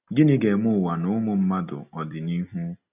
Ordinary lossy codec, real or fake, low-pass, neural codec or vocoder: none; real; 3.6 kHz; none